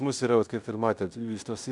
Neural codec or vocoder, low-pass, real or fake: codec, 16 kHz in and 24 kHz out, 0.9 kbps, LongCat-Audio-Codec, fine tuned four codebook decoder; 10.8 kHz; fake